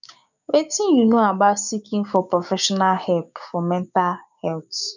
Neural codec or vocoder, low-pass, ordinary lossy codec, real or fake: codec, 16 kHz, 6 kbps, DAC; 7.2 kHz; none; fake